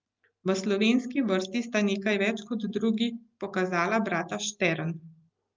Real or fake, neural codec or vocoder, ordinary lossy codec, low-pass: real; none; Opus, 32 kbps; 7.2 kHz